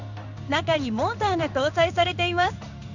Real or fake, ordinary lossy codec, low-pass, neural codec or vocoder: fake; none; 7.2 kHz; codec, 16 kHz in and 24 kHz out, 1 kbps, XY-Tokenizer